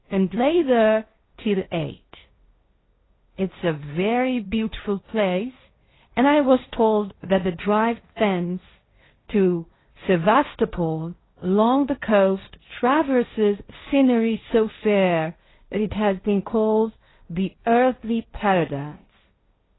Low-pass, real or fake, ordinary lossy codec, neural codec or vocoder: 7.2 kHz; fake; AAC, 16 kbps; codec, 16 kHz, 1.1 kbps, Voila-Tokenizer